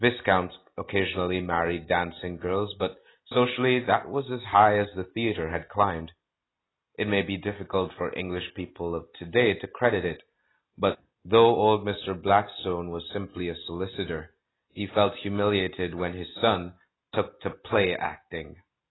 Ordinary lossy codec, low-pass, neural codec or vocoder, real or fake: AAC, 16 kbps; 7.2 kHz; none; real